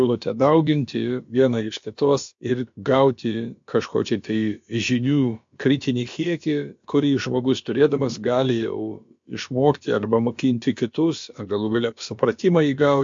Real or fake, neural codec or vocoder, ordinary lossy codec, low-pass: fake; codec, 16 kHz, about 1 kbps, DyCAST, with the encoder's durations; MP3, 48 kbps; 7.2 kHz